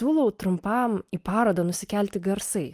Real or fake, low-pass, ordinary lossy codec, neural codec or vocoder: real; 14.4 kHz; Opus, 32 kbps; none